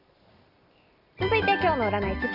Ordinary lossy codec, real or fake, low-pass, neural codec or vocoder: Opus, 64 kbps; real; 5.4 kHz; none